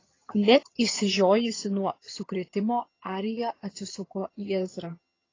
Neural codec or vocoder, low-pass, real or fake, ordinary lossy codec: vocoder, 22.05 kHz, 80 mel bands, HiFi-GAN; 7.2 kHz; fake; AAC, 32 kbps